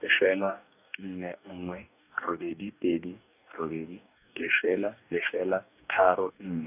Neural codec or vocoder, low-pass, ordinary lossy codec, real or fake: codec, 44.1 kHz, 2.6 kbps, DAC; 3.6 kHz; none; fake